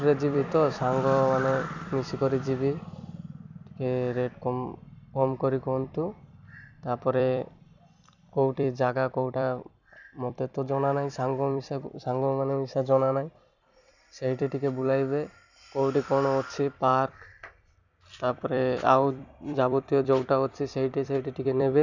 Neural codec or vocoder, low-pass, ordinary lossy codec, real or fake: vocoder, 44.1 kHz, 128 mel bands every 256 samples, BigVGAN v2; 7.2 kHz; none; fake